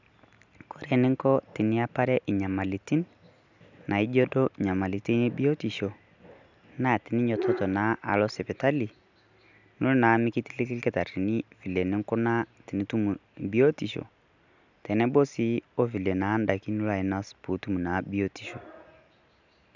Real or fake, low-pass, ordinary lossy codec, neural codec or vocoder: real; 7.2 kHz; none; none